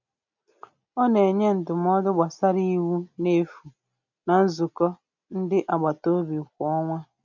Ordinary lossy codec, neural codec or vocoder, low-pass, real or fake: none; none; 7.2 kHz; real